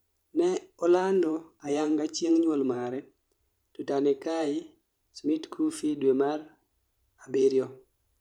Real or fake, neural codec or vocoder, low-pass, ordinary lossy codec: fake; vocoder, 44.1 kHz, 128 mel bands every 512 samples, BigVGAN v2; 19.8 kHz; none